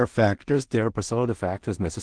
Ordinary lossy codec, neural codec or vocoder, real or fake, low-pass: Opus, 16 kbps; codec, 16 kHz in and 24 kHz out, 0.4 kbps, LongCat-Audio-Codec, two codebook decoder; fake; 9.9 kHz